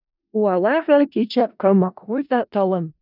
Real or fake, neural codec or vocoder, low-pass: fake; codec, 16 kHz in and 24 kHz out, 0.4 kbps, LongCat-Audio-Codec, four codebook decoder; 5.4 kHz